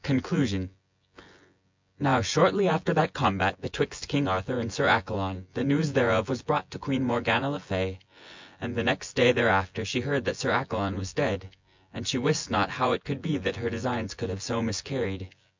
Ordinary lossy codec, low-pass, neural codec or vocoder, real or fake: MP3, 64 kbps; 7.2 kHz; vocoder, 24 kHz, 100 mel bands, Vocos; fake